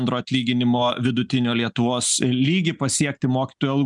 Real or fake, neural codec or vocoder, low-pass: real; none; 10.8 kHz